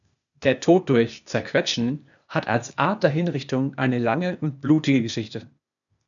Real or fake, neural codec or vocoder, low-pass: fake; codec, 16 kHz, 0.8 kbps, ZipCodec; 7.2 kHz